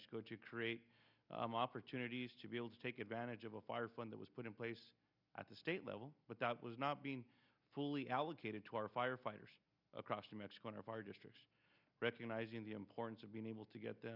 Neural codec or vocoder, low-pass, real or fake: none; 5.4 kHz; real